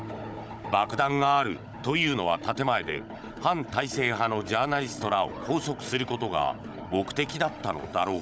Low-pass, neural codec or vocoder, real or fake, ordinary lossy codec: none; codec, 16 kHz, 16 kbps, FunCodec, trained on Chinese and English, 50 frames a second; fake; none